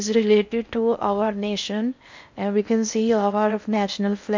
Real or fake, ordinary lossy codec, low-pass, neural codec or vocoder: fake; MP3, 64 kbps; 7.2 kHz; codec, 16 kHz in and 24 kHz out, 0.6 kbps, FocalCodec, streaming, 4096 codes